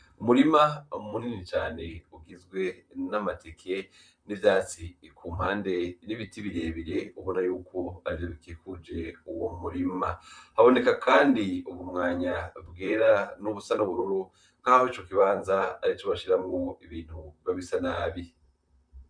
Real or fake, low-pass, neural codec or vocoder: fake; 9.9 kHz; vocoder, 44.1 kHz, 128 mel bands, Pupu-Vocoder